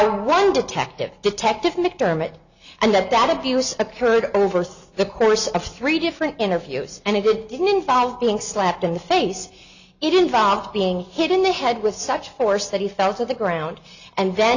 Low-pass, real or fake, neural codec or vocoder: 7.2 kHz; real; none